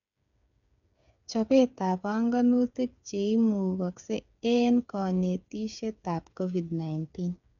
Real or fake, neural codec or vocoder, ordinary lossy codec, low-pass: fake; codec, 16 kHz, 8 kbps, FreqCodec, smaller model; none; 7.2 kHz